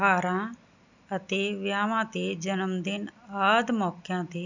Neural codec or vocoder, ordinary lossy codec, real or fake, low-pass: none; none; real; 7.2 kHz